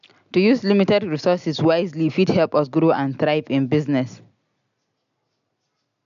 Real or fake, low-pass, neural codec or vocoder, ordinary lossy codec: real; 7.2 kHz; none; AAC, 96 kbps